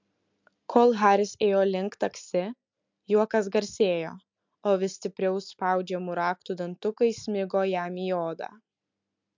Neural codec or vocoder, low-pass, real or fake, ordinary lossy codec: none; 7.2 kHz; real; MP3, 64 kbps